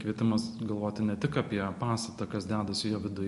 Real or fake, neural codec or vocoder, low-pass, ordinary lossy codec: real; none; 14.4 kHz; MP3, 48 kbps